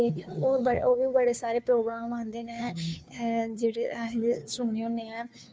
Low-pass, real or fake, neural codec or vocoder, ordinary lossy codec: none; fake; codec, 16 kHz, 2 kbps, FunCodec, trained on Chinese and English, 25 frames a second; none